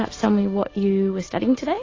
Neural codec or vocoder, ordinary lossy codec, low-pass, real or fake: none; AAC, 32 kbps; 7.2 kHz; real